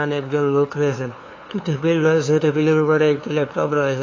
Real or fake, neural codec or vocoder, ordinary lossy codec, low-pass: fake; codec, 16 kHz, 2 kbps, FunCodec, trained on LibriTTS, 25 frames a second; AAC, 32 kbps; 7.2 kHz